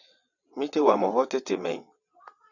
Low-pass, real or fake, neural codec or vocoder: 7.2 kHz; fake; vocoder, 22.05 kHz, 80 mel bands, WaveNeXt